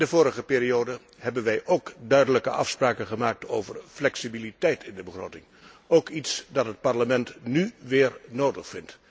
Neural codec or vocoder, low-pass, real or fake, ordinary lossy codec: none; none; real; none